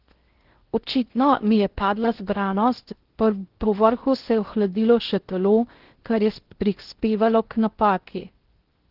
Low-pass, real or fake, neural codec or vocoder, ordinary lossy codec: 5.4 kHz; fake; codec, 16 kHz in and 24 kHz out, 0.6 kbps, FocalCodec, streaming, 4096 codes; Opus, 16 kbps